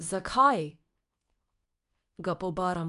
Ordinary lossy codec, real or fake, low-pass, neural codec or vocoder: MP3, 96 kbps; fake; 10.8 kHz; codec, 24 kHz, 0.9 kbps, WavTokenizer, medium speech release version 2